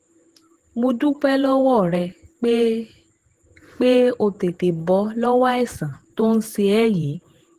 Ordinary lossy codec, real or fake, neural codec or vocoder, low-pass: Opus, 16 kbps; fake; vocoder, 48 kHz, 128 mel bands, Vocos; 14.4 kHz